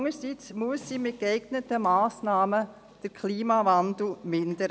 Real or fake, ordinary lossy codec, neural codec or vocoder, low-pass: real; none; none; none